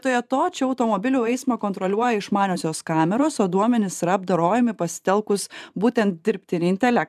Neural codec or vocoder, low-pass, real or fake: vocoder, 44.1 kHz, 128 mel bands every 512 samples, BigVGAN v2; 14.4 kHz; fake